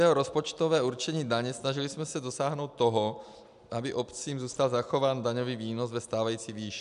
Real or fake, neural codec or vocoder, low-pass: real; none; 10.8 kHz